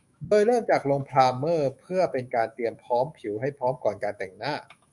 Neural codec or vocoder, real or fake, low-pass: autoencoder, 48 kHz, 128 numbers a frame, DAC-VAE, trained on Japanese speech; fake; 10.8 kHz